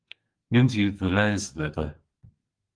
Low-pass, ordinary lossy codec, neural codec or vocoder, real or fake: 9.9 kHz; Opus, 24 kbps; codec, 44.1 kHz, 2.6 kbps, SNAC; fake